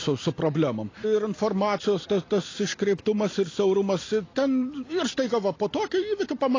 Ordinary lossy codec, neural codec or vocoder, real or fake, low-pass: AAC, 32 kbps; none; real; 7.2 kHz